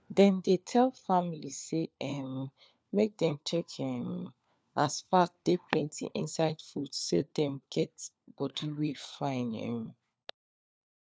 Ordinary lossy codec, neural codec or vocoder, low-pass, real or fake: none; codec, 16 kHz, 4 kbps, FunCodec, trained on LibriTTS, 50 frames a second; none; fake